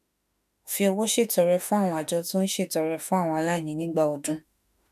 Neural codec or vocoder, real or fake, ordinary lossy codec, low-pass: autoencoder, 48 kHz, 32 numbers a frame, DAC-VAE, trained on Japanese speech; fake; none; 14.4 kHz